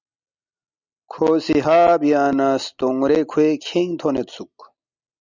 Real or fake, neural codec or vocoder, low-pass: real; none; 7.2 kHz